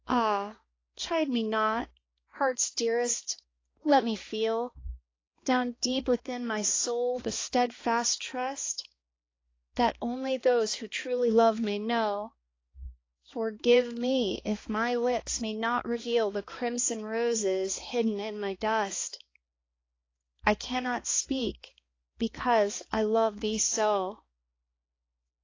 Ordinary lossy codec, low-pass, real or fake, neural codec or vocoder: AAC, 32 kbps; 7.2 kHz; fake; codec, 16 kHz, 2 kbps, X-Codec, HuBERT features, trained on balanced general audio